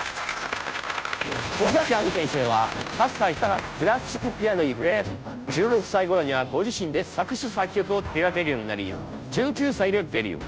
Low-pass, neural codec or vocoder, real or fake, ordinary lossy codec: none; codec, 16 kHz, 0.5 kbps, FunCodec, trained on Chinese and English, 25 frames a second; fake; none